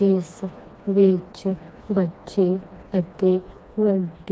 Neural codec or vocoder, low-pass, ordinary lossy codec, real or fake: codec, 16 kHz, 2 kbps, FreqCodec, smaller model; none; none; fake